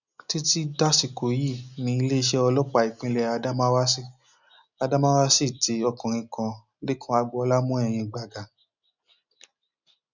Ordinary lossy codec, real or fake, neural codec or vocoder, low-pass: none; real; none; 7.2 kHz